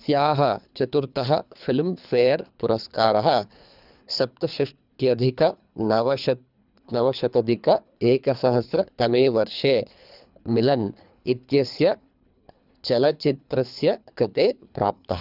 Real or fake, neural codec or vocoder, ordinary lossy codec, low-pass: fake; codec, 24 kHz, 3 kbps, HILCodec; none; 5.4 kHz